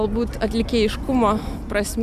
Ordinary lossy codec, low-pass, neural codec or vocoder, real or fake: MP3, 96 kbps; 14.4 kHz; none; real